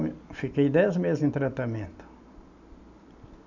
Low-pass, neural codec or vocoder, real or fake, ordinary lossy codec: 7.2 kHz; none; real; none